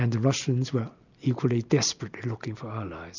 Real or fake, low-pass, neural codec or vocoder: real; 7.2 kHz; none